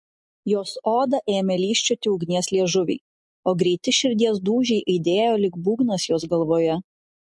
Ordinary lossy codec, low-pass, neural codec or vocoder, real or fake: MP3, 48 kbps; 10.8 kHz; none; real